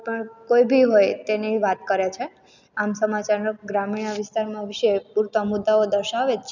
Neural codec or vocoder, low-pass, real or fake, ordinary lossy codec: none; 7.2 kHz; real; none